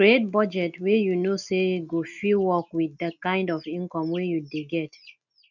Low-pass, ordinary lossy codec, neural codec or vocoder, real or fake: 7.2 kHz; none; none; real